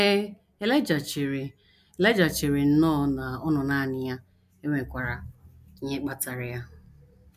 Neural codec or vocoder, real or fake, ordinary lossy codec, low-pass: none; real; none; 14.4 kHz